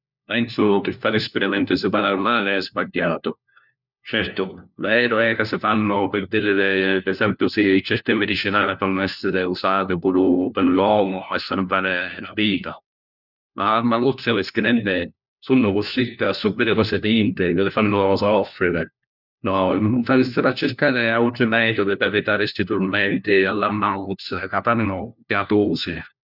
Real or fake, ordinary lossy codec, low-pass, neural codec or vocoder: fake; none; 5.4 kHz; codec, 16 kHz, 1 kbps, FunCodec, trained on LibriTTS, 50 frames a second